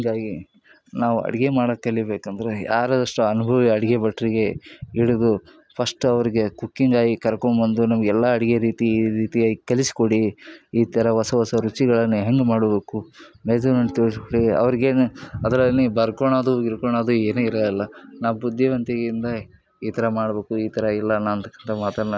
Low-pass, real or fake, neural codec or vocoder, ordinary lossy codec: none; real; none; none